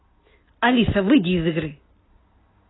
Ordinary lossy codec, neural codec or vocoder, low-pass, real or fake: AAC, 16 kbps; none; 7.2 kHz; real